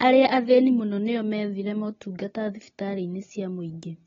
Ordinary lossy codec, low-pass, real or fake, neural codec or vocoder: AAC, 24 kbps; 7.2 kHz; real; none